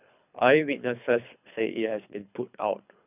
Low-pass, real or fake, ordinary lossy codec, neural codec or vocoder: 3.6 kHz; fake; none; codec, 24 kHz, 3 kbps, HILCodec